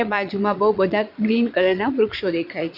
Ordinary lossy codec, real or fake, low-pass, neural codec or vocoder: none; fake; 5.4 kHz; vocoder, 22.05 kHz, 80 mel bands, Vocos